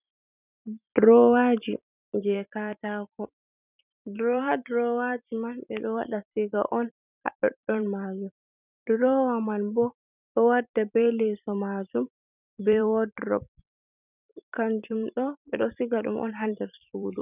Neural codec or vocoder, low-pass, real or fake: vocoder, 44.1 kHz, 128 mel bands every 256 samples, BigVGAN v2; 3.6 kHz; fake